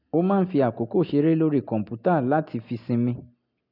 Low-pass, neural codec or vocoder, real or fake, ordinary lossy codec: 5.4 kHz; none; real; none